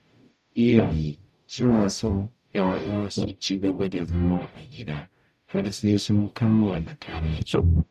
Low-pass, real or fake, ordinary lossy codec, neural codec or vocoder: 14.4 kHz; fake; none; codec, 44.1 kHz, 0.9 kbps, DAC